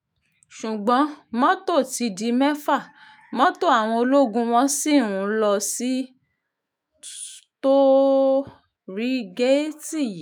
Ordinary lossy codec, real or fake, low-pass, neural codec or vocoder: none; fake; none; autoencoder, 48 kHz, 128 numbers a frame, DAC-VAE, trained on Japanese speech